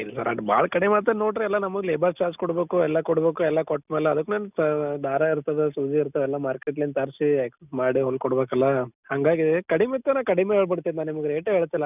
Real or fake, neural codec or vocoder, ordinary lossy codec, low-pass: real; none; none; 3.6 kHz